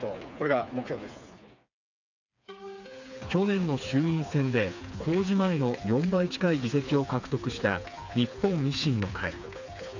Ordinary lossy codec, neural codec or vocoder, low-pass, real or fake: none; codec, 16 kHz, 4 kbps, FreqCodec, smaller model; 7.2 kHz; fake